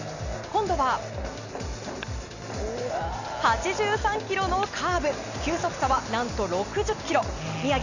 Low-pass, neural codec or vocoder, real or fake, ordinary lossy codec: 7.2 kHz; none; real; none